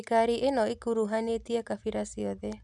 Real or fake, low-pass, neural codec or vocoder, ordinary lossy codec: real; none; none; none